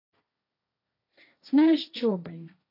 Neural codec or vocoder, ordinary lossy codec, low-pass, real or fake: codec, 16 kHz, 1.1 kbps, Voila-Tokenizer; AAC, 32 kbps; 5.4 kHz; fake